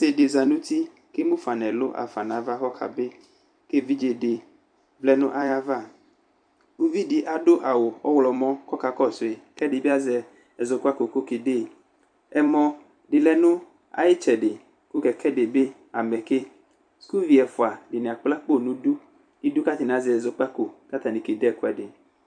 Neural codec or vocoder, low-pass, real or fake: vocoder, 44.1 kHz, 128 mel bands every 256 samples, BigVGAN v2; 9.9 kHz; fake